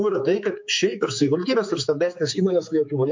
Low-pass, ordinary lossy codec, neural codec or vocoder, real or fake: 7.2 kHz; MP3, 48 kbps; codec, 16 kHz, 4 kbps, X-Codec, HuBERT features, trained on general audio; fake